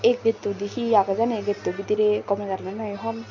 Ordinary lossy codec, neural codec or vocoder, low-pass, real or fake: none; none; 7.2 kHz; real